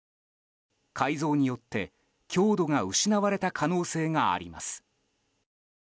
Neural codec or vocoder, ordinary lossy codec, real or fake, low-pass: none; none; real; none